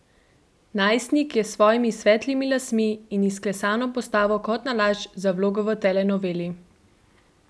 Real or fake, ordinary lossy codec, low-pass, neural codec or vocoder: real; none; none; none